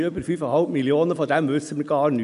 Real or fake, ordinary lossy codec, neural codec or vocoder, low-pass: real; none; none; 10.8 kHz